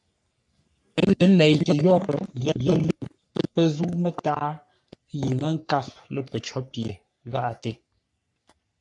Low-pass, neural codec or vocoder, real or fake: 10.8 kHz; codec, 44.1 kHz, 3.4 kbps, Pupu-Codec; fake